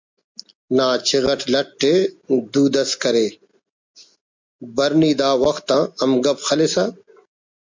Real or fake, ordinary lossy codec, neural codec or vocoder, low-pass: real; MP3, 64 kbps; none; 7.2 kHz